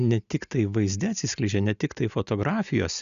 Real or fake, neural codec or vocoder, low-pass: real; none; 7.2 kHz